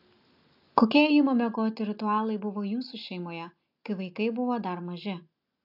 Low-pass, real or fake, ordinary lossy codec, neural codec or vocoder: 5.4 kHz; real; AAC, 48 kbps; none